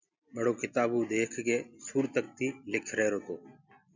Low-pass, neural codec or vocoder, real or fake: 7.2 kHz; none; real